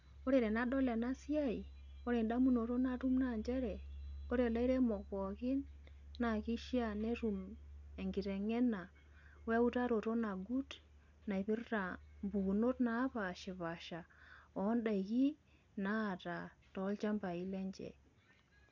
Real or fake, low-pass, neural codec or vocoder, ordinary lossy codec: real; 7.2 kHz; none; none